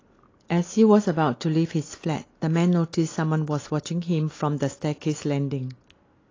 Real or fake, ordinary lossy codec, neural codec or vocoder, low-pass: real; AAC, 32 kbps; none; 7.2 kHz